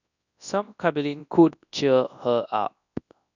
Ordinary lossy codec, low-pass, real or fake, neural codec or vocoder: none; 7.2 kHz; fake; codec, 24 kHz, 0.9 kbps, WavTokenizer, large speech release